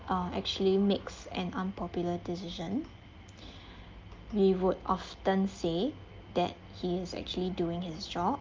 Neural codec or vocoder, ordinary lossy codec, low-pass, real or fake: none; Opus, 32 kbps; 7.2 kHz; real